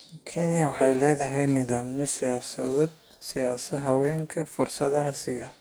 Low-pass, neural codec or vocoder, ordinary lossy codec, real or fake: none; codec, 44.1 kHz, 2.6 kbps, DAC; none; fake